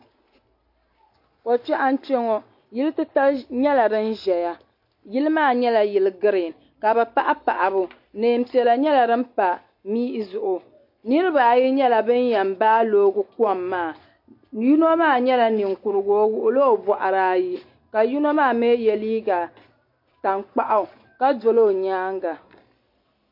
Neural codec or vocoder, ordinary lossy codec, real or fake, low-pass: none; MP3, 32 kbps; real; 5.4 kHz